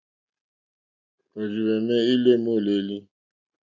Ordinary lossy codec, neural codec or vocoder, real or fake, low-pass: MP3, 64 kbps; none; real; 7.2 kHz